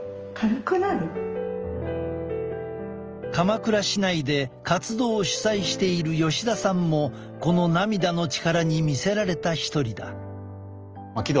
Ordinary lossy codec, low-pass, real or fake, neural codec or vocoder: Opus, 24 kbps; 7.2 kHz; real; none